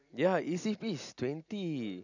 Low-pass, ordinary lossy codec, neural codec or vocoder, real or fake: 7.2 kHz; none; none; real